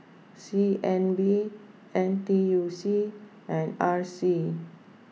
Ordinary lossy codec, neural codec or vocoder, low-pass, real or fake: none; none; none; real